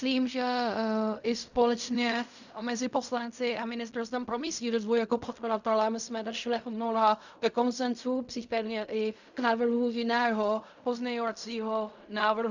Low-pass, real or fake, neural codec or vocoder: 7.2 kHz; fake; codec, 16 kHz in and 24 kHz out, 0.4 kbps, LongCat-Audio-Codec, fine tuned four codebook decoder